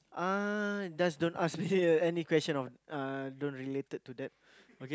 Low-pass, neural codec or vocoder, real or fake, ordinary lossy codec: none; none; real; none